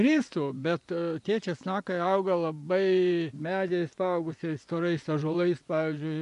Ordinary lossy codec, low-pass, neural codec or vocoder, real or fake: MP3, 96 kbps; 10.8 kHz; vocoder, 24 kHz, 100 mel bands, Vocos; fake